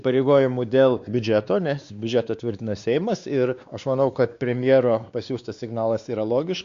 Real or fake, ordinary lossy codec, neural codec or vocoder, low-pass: fake; Opus, 64 kbps; codec, 16 kHz, 2 kbps, X-Codec, WavLM features, trained on Multilingual LibriSpeech; 7.2 kHz